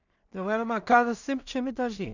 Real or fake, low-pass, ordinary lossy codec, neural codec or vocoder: fake; 7.2 kHz; none; codec, 16 kHz in and 24 kHz out, 0.4 kbps, LongCat-Audio-Codec, two codebook decoder